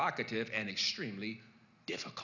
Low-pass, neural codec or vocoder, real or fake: 7.2 kHz; none; real